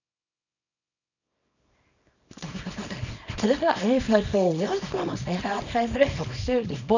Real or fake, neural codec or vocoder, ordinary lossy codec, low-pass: fake; codec, 24 kHz, 0.9 kbps, WavTokenizer, small release; none; 7.2 kHz